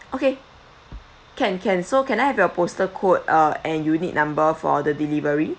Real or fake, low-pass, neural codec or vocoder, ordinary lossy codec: real; none; none; none